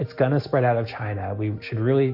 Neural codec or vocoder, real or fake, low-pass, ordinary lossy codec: none; real; 5.4 kHz; AAC, 48 kbps